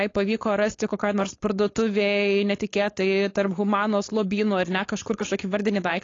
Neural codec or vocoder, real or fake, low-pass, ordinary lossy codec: codec, 16 kHz, 4.8 kbps, FACodec; fake; 7.2 kHz; AAC, 32 kbps